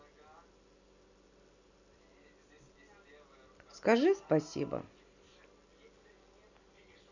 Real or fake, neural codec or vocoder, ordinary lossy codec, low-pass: real; none; none; 7.2 kHz